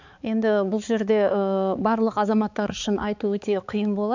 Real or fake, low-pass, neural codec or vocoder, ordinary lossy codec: fake; 7.2 kHz; codec, 16 kHz, 4 kbps, X-Codec, HuBERT features, trained on balanced general audio; none